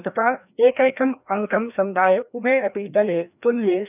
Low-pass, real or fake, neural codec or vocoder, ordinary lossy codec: 3.6 kHz; fake; codec, 16 kHz, 1 kbps, FreqCodec, larger model; none